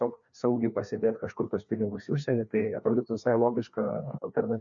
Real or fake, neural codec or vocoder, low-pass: fake; codec, 16 kHz, 2 kbps, FreqCodec, larger model; 7.2 kHz